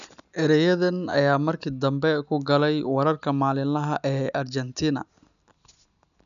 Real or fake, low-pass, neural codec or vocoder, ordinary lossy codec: real; 7.2 kHz; none; none